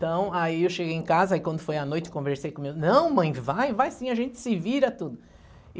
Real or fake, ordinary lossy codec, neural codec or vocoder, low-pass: real; none; none; none